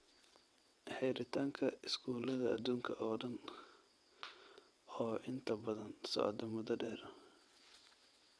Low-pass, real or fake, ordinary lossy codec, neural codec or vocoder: none; fake; none; vocoder, 22.05 kHz, 80 mel bands, WaveNeXt